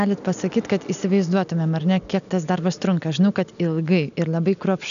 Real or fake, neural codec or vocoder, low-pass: real; none; 7.2 kHz